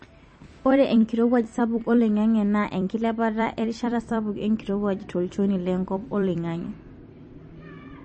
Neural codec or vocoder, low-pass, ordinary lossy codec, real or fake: vocoder, 44.1 kHz, 128 mel bands every 256 samples, BigVGAN v2; 10.8 kHz; MP3, 32 kbps; fake